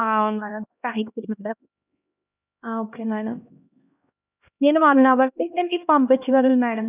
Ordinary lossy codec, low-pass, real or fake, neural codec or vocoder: none; 3.6 kHz; fake; codec, 16 kHz, 1 kbps, X-Codec, HuBERT features, trained on LibriSpeech